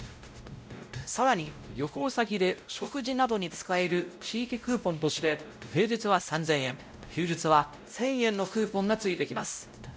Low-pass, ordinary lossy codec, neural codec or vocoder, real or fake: none; none; codec, 16 kHz, 0.5 kbps, X-Codec, WavLM features, trained on Multilingual LibriSpeech; fake